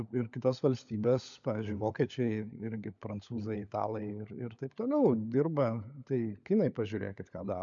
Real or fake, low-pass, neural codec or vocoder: fake; 7.2 kHz; codec, 16 kHz, 16 kbps, FunCodec, trained on LibriTTS, 50 frames a second